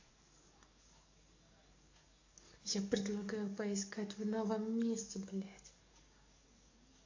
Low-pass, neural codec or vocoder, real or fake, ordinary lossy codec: 7.2 kHz; codec, 44.1 kHz, 7.8 kbps, DAC; fake; AAC, 48 kbps